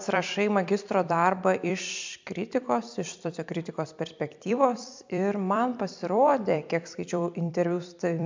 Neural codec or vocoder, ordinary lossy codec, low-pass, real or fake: vocoder, 44.1 kHz, 128 mel bands every 256 samples, BigVGAN v2; MP3, 64 kbps; 7.2 kHz; fake